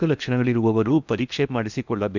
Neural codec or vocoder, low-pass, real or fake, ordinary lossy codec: codec, 16 kHz in and 24 kHz out, 0.8 kbps, FocalCodec, streaming, 65536 codes; 7.2 kHz; fake; none